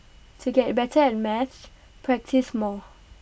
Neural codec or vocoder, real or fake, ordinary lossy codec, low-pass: none; real; none; none